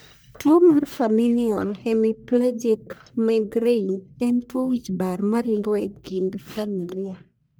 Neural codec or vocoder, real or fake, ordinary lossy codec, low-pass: codec, 44.1 kHz, 1.7 kbps, Pupu-Codec; fake; none; none